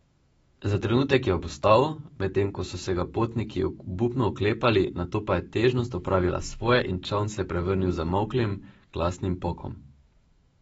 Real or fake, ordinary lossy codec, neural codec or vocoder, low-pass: fake; AAC, 24 kbps; autoencoder, 48 kHz, 128 numbers a frame, DAC-VAE, trained on Japanese speech; 19.8 kHz